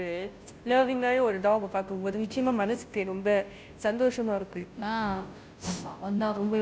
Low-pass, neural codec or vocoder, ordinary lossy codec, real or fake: none; codec, 16 kHz, 0.5 kbps, FunCodec, trained on Chinese and English, 25 frames a second; none; fake